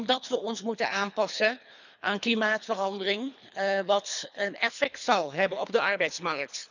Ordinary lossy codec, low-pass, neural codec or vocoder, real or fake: none; 7.2 kHz; codec, 24 kHz, 3 kbps, HILCodec; fake